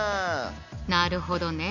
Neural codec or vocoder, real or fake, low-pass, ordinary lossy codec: none; real; 7.2 kHz; none